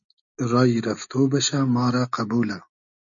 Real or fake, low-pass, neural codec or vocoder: real; 7.2 kHz; none